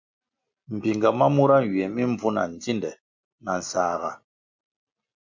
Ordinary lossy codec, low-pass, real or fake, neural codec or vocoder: MP3, 64 kbps; 7.2 kHz; real; none